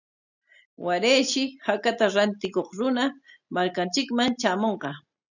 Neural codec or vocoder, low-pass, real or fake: none; 7.2 kHz; real